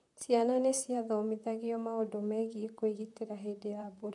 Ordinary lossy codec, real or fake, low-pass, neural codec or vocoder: none; fake; 10.8 kHz; vocoder, 44.1 kHz, 128 mel bands, Pupu-Vocoder